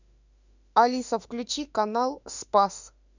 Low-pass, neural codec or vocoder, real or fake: 7.2 kHz; autoencoder, 48 kHz, 32 numbers a frame, DAC-VAE, trained on Japanese speech; fake